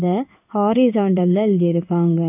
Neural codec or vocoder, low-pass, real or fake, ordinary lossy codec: codec, 16 kHz in and 24 kHz out, 1 kbps, XY-Tokenizer; 3.6 kHz; fake; none